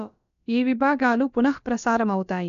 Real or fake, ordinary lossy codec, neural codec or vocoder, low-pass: fake; none; codec, 16 kHz, about 1 kbps, DyCAST, with the encoder's durations; 7.2 kHz